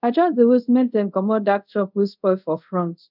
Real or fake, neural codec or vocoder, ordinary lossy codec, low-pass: fake; codec, 24 kHz, 0.5 kbps, DualCodec; none; 5.4 kHz